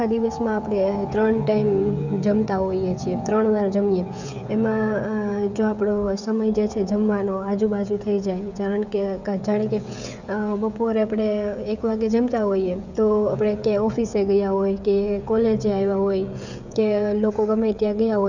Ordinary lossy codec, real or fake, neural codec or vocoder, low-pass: none; fake; codec, 16 kHz, 16 kbps, FreqCodec, smaller model; 7.2 kHz